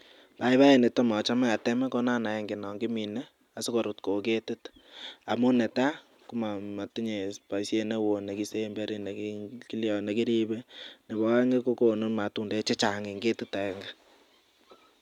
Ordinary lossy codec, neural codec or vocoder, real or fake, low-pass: none; none; real; 19.8 kHz